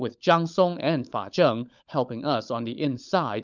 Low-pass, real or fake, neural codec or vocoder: 7.2 kHz; fake; codec, 16 kHz, 16 kbps, FunCodec, trained on LibriTTS, 50 frames a second